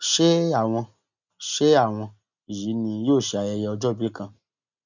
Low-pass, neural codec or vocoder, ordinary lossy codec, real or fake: 7.2 kHz; none; none; real